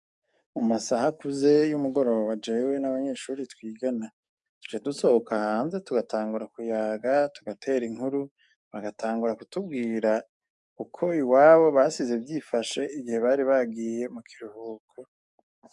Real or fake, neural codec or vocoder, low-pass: fake; codec, 44.1 kHz, 7.8 kbps, DAC; 10.8 kHz